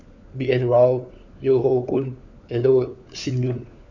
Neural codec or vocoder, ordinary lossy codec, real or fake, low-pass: codec, 16 kHz, 4 kbps, FunCodec, trained on LibriTTS, 50 frames a second; none; fake; 7.2 kHz